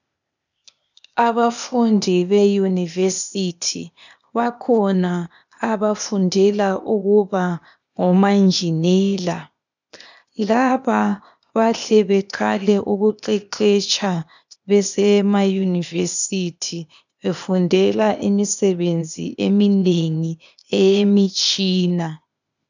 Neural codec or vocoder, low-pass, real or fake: codec, 16 kHz, 0.8 kbps, ZipCodec; 7.2 kHz; fake